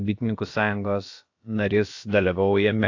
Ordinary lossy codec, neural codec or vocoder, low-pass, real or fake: AAC, 48 kbps; codec, 16 kHz, about 1 kbps, DyCAST, with the encoder's durations; 7.2 kHz; fake